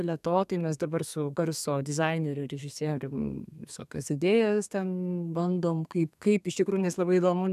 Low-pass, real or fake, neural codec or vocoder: 14.4 kHz; fake; codec, 32 kHz, 1.9 kbps, SNAC